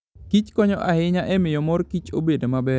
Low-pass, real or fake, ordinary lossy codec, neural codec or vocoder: none; real; none; none